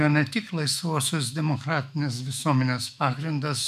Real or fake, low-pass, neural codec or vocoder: fake; 14.4 kHz; autoencoder, 48 kHz, 128 numbers a frame, DAC-VAE, trained on Japanese speech